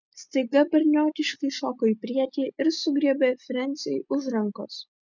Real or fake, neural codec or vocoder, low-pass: real; none; 7.2 kHz